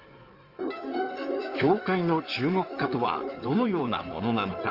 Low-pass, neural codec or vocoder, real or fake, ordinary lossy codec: 5.4 kHz; codec, 16 kHz in and 24 kHz out, 2.2 kbps, FireRedTTS-2 codec; fake; Opus, 32 kbps